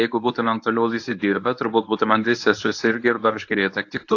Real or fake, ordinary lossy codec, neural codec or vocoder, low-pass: fake; AAC, 48 kbps; codec, 24 kHz, 0.9 kbps, WavTokenizer, medium speech release version 1; 7.2 kHz